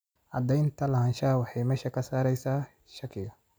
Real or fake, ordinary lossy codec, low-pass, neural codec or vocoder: real; none; none; none